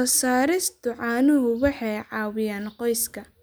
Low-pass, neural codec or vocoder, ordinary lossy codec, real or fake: none; none; none; real